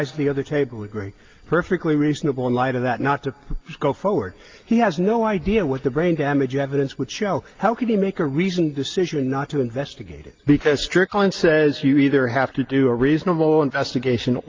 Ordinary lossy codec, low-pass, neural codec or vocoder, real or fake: Opus, 24 kbps; 7.2 kHz; none; real